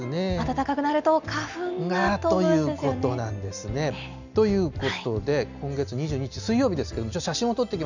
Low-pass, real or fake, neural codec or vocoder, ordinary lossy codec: 7.2 kHz; real; none; none